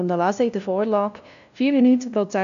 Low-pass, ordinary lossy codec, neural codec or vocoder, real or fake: 7.2 kHz; none; codec, 16 kHz, 0.5 kbps, FunCodec, trained on LibriTTS, 25 frames a second; fake